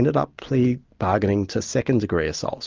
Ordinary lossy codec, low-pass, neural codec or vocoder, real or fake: Opus, 24 kbps; 7.2 kHz; none; real